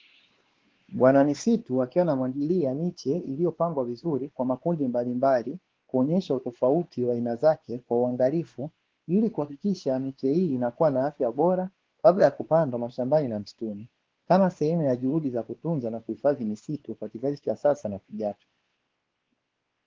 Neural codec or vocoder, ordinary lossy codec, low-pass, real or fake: codec, 16 kHz, 2 kbps, X-Codec, WavLM features, trained on Multilingual LibriSpeech; Opus, 16 kbps; 7.2 kHz; fake